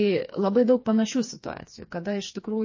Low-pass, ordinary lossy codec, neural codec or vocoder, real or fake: 7.2 kHz; MP3, 32 kbps; codec, 16 kHz, 4 kbps, FreqCodec, smaller model; fake